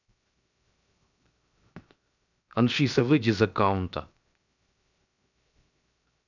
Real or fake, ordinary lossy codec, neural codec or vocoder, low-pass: fake; none; codec, 16 kHz, 0.7 kbps, FocalCodec; 7.2 kHz